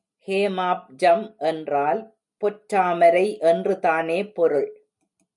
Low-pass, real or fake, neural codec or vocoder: 10.8 kHz; real; none